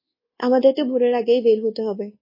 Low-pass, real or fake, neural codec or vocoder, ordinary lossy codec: 5.4 kHz; fake; codec, 24 kHz, 1.2 kbps, DualCodec; MP3, 24 kbps